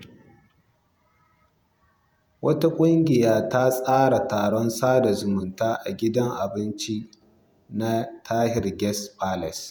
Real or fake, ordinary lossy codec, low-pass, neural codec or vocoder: fake; none; none; vocoder, 48 kHz, 128 mel bands, Vocos